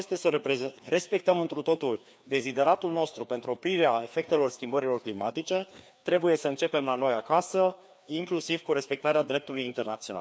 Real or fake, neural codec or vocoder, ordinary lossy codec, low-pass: fake; codec, 16 kHz, 2 kbps, FreqCodec, larger model; none; none